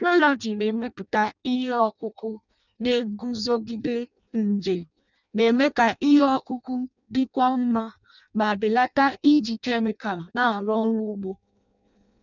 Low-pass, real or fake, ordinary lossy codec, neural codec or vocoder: 7.2 kHz; fake; none; codec, 16 kHz in and 24 kHz out, 0.6 kbps, FireRedTTS-2 codec